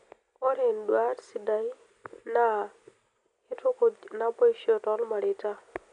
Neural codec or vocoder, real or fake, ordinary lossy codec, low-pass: none; real; none; 9.9 kHz